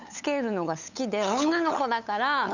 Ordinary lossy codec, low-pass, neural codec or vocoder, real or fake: none; 7.2 kHz; codec, 16 kHz, 8 kbps, FunCodec, trained on LibriTTS, 25 frames a second; fake